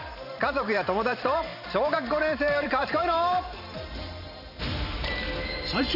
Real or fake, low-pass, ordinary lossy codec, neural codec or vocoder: fake; 5.4 kHz; none; vocoder, 44.1 kHz, 128 mel bands every 512 samples, BigVGAN v2